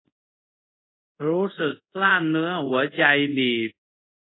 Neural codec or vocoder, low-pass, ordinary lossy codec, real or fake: codec, 24 kHz, 0.5 kbps, DualCodec; 7.2 kHz; AAC, 16 kbps; fake